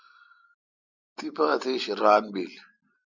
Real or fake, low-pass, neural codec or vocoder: real; 7.2 kHz; none